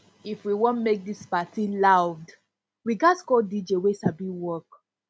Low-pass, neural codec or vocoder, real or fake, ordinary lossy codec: none; none; real; none